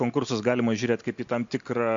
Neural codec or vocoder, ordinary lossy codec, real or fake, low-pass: none; MP3, 48 kbps; real; 7.2 kHz